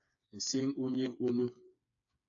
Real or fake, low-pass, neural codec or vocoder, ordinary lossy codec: fake; 7.2 kHz; codec, 16 kHz, 4 kbps, FreqCodec, smaller model; AAC, 48 kbps